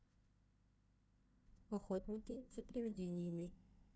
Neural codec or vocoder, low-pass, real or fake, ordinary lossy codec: codec, 16 kHz, 1 kbps, FunCodec, trained on Chinese and English, 50 frames a second; none; fake; none